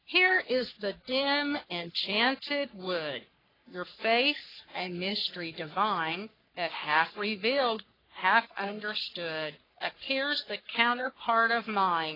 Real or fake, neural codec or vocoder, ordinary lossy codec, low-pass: fake; codec, 44.1 kHz, 3.4 kbps, Pupu-Codec; AAC, 24 kbps; 5.4 kHz